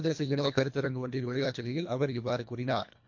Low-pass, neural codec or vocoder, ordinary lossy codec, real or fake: 7.2 kHz; codec, 24 kHz, 1.5 kbps, HILCodec; MP3, 48 kbps; fake